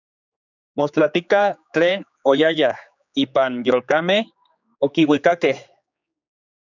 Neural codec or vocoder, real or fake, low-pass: codec, 16 kHz, 4 kbps, X-Codec, HuBERT features, trained on general audio; fake; 7.2 kHz